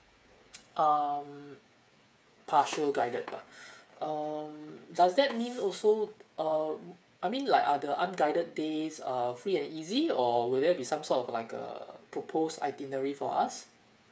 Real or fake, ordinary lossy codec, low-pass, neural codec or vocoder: fake; none; none; codec, 16 kHz, 8 kbps, FreqCodec, smaller model